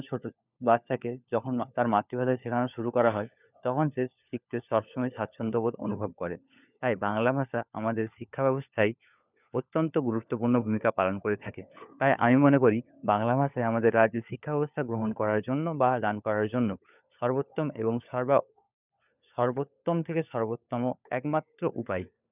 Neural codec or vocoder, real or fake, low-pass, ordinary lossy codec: codec, 16 kHz, 4 kbps, FunCodec, trained on LibriTTS, 50 frames a second; fake; 3.6 kHz; none